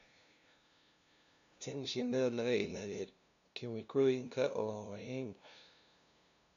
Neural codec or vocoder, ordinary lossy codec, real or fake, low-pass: codec, 16 kHz, 0.5 kbps, FunCodec, trained on LibriTTS, 25 frames a second; none; fake; 7.2 kHz